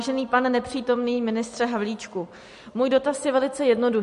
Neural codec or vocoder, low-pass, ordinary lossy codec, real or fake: none; 14.4 kHz; MP3, 48 kbps; real